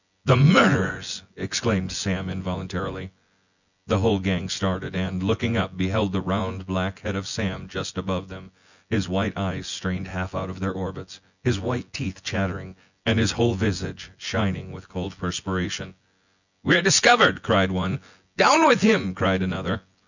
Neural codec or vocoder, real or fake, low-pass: vocoder, 24 kHz, 100 mel bands, Vocos; fake; 7.2 kHz